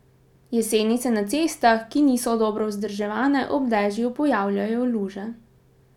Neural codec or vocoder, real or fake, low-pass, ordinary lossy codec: none; real; 19.8 kHz; none